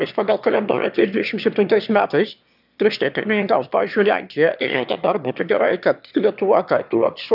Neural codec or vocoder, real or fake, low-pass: autoencoder, 22.05 kHz, a latent of 192 numbers a frame, VITS, trained on one speaker; fake; 5.4 kHz